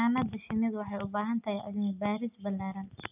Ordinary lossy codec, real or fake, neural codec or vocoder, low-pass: none; real; none; 3.6 kHz